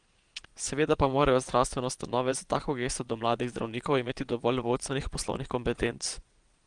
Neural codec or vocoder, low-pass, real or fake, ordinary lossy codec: vocoder, 22.05 kHz, 80 mel bands, Vocos; 9.9 kHz; fake; Opus, 24 kbps